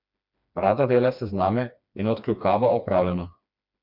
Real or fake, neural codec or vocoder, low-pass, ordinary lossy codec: fake; codec, 16 kHz, 2 kbps, FreqCodec, smaller model; 5.4 kHz; none